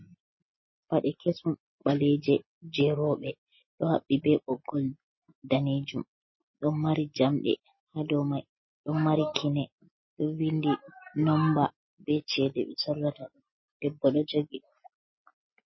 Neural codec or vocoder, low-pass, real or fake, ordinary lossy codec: none; 7.2 kHz; real; MP3, 24 kbps